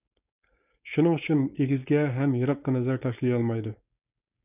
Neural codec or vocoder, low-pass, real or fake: codec, 16 kHz, 4.8 kbps, FACodec; 3.6 kHz; fake